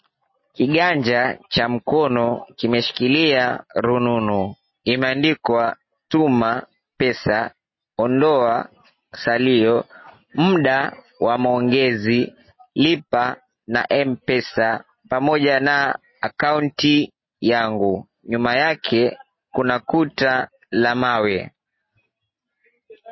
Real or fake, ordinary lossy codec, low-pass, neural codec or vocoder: real; MP3, 24 kbps; 7.2 kHz; none